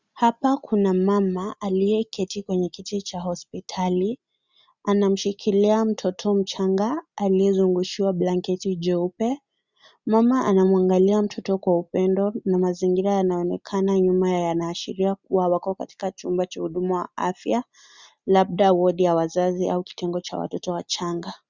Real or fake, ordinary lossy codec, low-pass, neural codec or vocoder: real; Opus, 64 kbps; 7.2 kHz; none